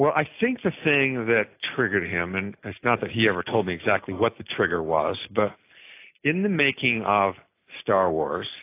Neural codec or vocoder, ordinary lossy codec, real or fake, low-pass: none; AAC, 24 kbps; real; 3.6 kHz